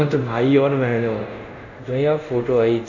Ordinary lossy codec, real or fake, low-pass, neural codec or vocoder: none; fake; 7.2 kHz; codec, 24 kHz, 0.5 kbps, DualCodec